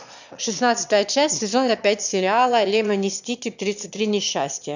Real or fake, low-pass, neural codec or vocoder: fake; 7.2 kHz; autoencoder, 22.05 kHz, a latent of 192 numbers a frame, VITS, trained on one speaker